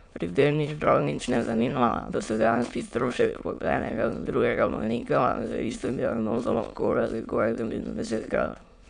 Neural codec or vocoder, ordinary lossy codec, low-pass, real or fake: autoencoder, 22.05 kHz, a latent of 192 numbers a frame, VITS, trained on many speakers; none; 9.9 kHz; fake